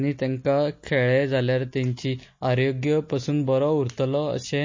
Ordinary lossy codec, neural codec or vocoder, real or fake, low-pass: MP3, 32 kbps; none; real; 7.2 kHz